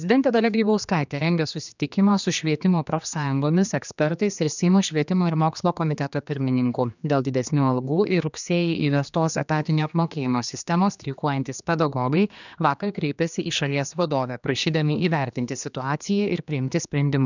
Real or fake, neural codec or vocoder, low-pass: fake; codec, 16 kHz, 2 kbps, X-Codec, HuBERT features, trained on general audio; 7.2 kHz